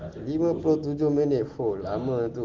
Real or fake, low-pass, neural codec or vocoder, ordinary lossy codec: real; 7.2 kHz; none; Opus, 16 kbps